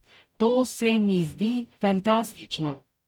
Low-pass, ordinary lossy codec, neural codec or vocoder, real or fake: 19.8 kHz; none; codec, 44.1 kHz, 0.9 kbps, DAC; fake